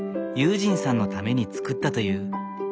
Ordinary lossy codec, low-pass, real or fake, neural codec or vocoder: none; none; real; none